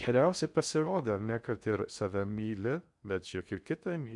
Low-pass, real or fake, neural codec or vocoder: 10.8 kHz; fake; codec, 16 kHz in and 24 kHz out, 0.6 kbps, FocalCodec, streaming, 4096 codes